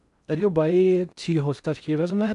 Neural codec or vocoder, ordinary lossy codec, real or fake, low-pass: codec, 16 kHz in and 24 kHz out, 0.6 kbps, FocalCodec, streaming, 4096 codes; none; fake; 10.8 kHz